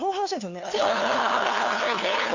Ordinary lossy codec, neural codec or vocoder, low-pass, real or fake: none; codec, 16 kHz, 2 kbps, FunCodec, trained on LibriTTS, 25 frames a second; 7.2 kHz; fake